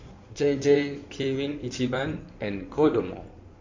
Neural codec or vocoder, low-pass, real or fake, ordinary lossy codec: codec, 16 kHz in and 24 kHz out, 2.2 kbps, FireRedTTS-2 codec; 7.2 kHz; fake; MP3, 48 kbps